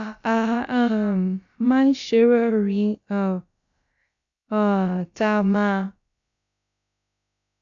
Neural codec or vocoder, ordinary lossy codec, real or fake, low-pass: codec, 16 kHz, about 1 kbps, DyCAST, with the encoder's durations; AAC, 64 kbps; fake; 7.2 kHz